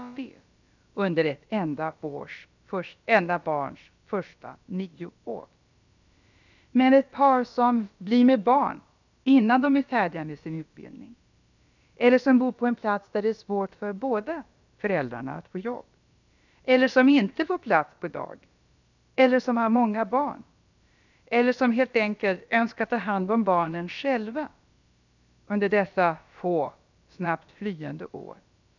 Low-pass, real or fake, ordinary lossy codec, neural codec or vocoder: 7.2 kHz; fake; none; codec, 16 kHz, about 1 kbps, DyCAST, with the encoder's durations